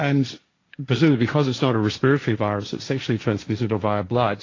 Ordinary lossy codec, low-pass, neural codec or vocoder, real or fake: AAC, 32 kbps; 7.2 kHz; codec, 16 kHz, 1.1 kbps, Voila-Tokenizer; fake